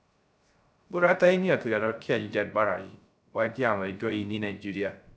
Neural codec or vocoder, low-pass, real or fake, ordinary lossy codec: codec, 16 kHz, 0.3 kbps, FocalCodec; none; fake; none